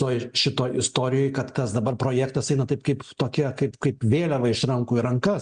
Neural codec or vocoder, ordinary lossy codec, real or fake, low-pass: none; Opus, 64 kbps; real; 9.9 kHz